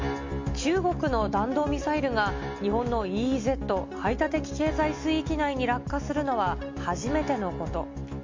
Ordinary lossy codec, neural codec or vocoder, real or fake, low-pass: MP3, 48 kbps; none; real; 7.2 kHz